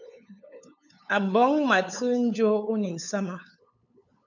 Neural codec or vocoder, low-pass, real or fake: codec, 16 kHz, 8 kbps, FunCodec, trained on LibriTTS, 25 frames a second; 7.2 kHz; fake